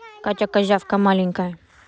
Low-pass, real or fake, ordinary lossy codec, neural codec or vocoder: none; real; none; none